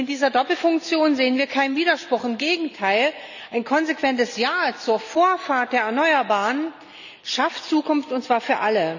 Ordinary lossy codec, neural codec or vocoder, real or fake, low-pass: none; none; real; 7.2 kHz